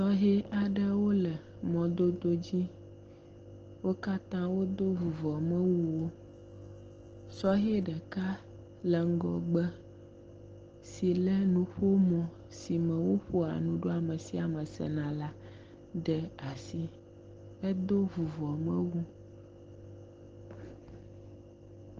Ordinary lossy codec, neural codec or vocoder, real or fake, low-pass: Opus, 16 kbps; none; real; 7.2 kHz